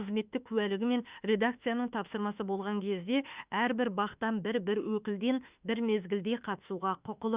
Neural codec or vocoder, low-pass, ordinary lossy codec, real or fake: autoencoder, 48 kHz, 32 numbers a frame, DAC-VAE, trained on Japanese speech; 3.6 kHz; Opus, 64 kbps; fake